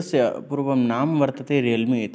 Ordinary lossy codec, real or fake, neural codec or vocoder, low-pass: none; real; none; none